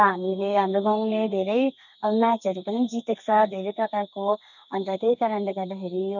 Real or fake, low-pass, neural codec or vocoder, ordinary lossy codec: fake; 7.2 kHz; codec, 32 kHz, 1.9 kbps, SNAC; none